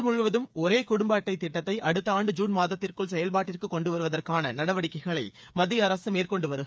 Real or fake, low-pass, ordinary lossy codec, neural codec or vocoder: fake; none; none; codec, 16 kHz, 8 kbps, FreqCodec, smaller model